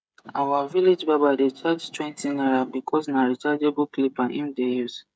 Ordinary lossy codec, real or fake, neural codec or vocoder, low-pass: none; fake; codec, 16 kHz, 16 kbps, FreqCodec, smaller model; none